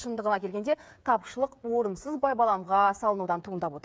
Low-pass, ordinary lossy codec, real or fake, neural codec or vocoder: none; none; fake; codec, 16 kHz, 8 kbps, FreqCodec, smaller model